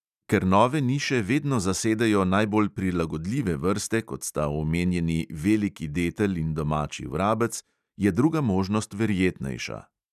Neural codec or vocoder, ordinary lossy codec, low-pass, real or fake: none; none; 14.4 kHz; real